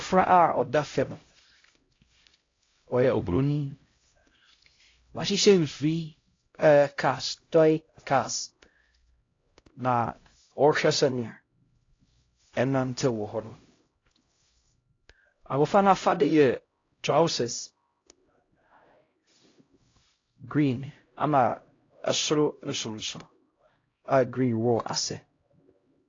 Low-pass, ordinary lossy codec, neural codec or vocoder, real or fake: 7.2 kHz; AAC, 32 kbps; codec, 16 kHz, 0.5 kbps, X-Codec, HuBERT features, trained on LibriSpeech; fake